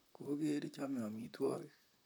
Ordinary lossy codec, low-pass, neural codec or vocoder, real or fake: none; none; vocoder, 44.1 kHz, 128 mel bands, Pupu-Vocoder; fake